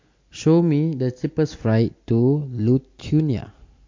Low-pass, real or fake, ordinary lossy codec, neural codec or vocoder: 7.2 kHz; real; MP3, 48 kbps; none